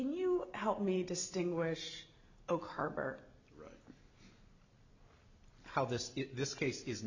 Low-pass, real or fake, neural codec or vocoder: 7.2 kHz; real; none